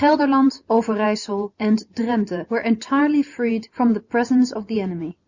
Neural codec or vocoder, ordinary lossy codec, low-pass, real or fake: none; Opus, 64 kbps; 7.2 kHz; real